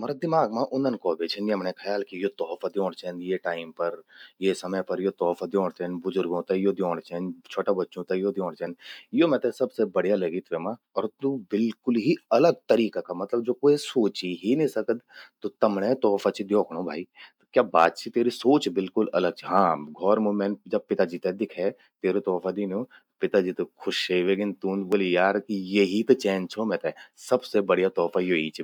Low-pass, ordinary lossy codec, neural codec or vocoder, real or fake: 19.8 kHz; none; none; real